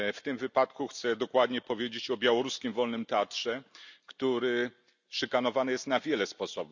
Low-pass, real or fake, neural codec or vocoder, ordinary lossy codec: 7.2 kHz; real; none; none